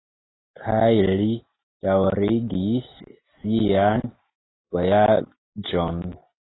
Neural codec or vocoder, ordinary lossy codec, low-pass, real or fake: none; AAC, 16 kbps; 7.2 kHz; real